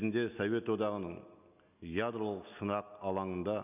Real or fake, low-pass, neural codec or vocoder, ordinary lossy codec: real; 3.6 kHz; none; none